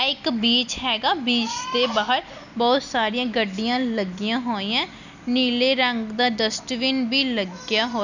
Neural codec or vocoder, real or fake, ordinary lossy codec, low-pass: none; real; none; 7.2 kHz